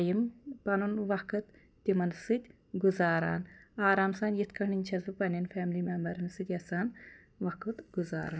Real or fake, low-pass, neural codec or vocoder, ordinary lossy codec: real; none; none; none